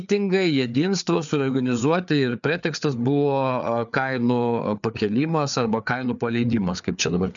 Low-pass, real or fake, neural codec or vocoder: 7.2 kHz; fake; codec, 16 kHz, 4 kbps, FreqCodec, larger model